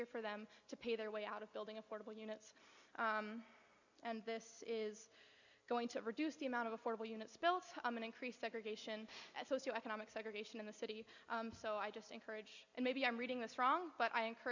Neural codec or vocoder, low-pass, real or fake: none; 7.2 kHz; real